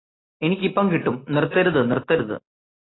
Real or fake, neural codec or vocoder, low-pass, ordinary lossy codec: real; none; 7.2 kHz; AAC, 16 kbps